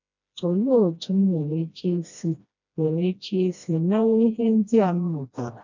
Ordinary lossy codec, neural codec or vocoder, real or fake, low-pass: none; codec, 16 kHz, 1 kbps, FreqCodec, smaller model; fake; 7.2 kHz